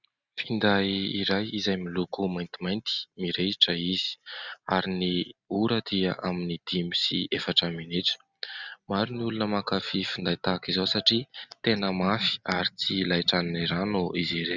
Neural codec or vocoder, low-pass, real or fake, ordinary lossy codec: none; 7.2 kHz; real; Opus, 64 kbps